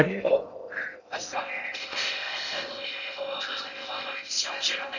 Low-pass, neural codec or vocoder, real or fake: 7.2 kHz; codec, 16 kHz in and 24 kHz out, 0.6 kbps, FocalCodec, streaming, 2048 codes; fake